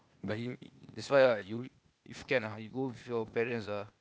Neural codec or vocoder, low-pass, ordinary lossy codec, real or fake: codec, 16 kHz, 0.8 kbps, ZipCodec; none; none; fake